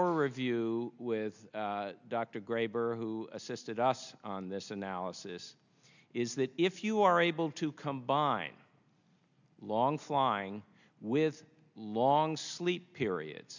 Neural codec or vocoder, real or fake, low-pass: none; real; 7.2 kHz